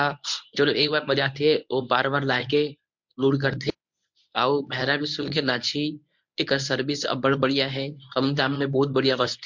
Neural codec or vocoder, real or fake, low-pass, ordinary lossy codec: codec, 24 kHz, 0.9 kbps, WavTokenizer, medium speech release version 1; fake; 7.2 kHz; MP3, 64 kbps